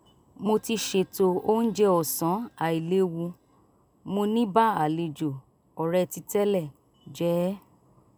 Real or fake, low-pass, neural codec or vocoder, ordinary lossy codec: real; none; none; none